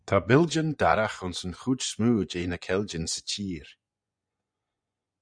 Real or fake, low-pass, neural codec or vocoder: fake; 9.9 kHz; vocoder, 24 kHz, 100 mel bands, Vocos